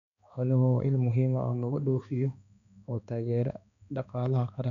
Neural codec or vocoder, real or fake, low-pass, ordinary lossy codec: codec, 16 kHz, 2 kbps, X-Codec, HuBERT features, trained on balanced general audio; fake; 7.2 kHz; none